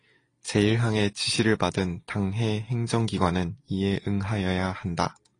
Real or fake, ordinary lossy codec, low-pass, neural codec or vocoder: real; AAC, 32 kbps; 9.9 kHz; none